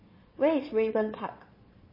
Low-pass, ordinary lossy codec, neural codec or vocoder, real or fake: 5.4 kHz; MP3, 24 kbps; vocoder, 22.05 kHz, 80 mel bands, Vocos; fake